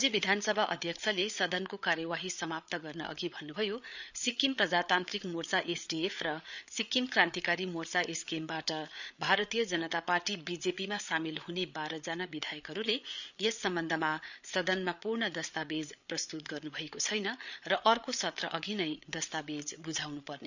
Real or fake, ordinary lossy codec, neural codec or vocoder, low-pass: fake; none; codec, 16 kHz, 8 kbps, FreqCodec, larger model; 7.2 kHz